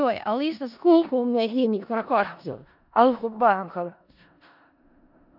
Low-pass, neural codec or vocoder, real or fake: 5.4 kHz; codec, 16 kHz in and 24 kHz out, 0.4 kbps, LongCat-Audio-Codec, four codebook decoder; fake